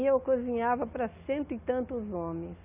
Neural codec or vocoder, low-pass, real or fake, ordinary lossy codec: none; 3.6 kHz; real; AAC, 32 kbps